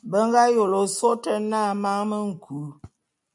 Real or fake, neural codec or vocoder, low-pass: real; none; 10.8 kHz